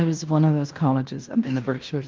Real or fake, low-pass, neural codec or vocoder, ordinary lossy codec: fake; 7.2 kHz; codec, 16 kHz in and 24 kHz out, 0.9 kbps, LongCat-Audio-Codec, fine tuned four codebook decoder; Opus, 32 kbps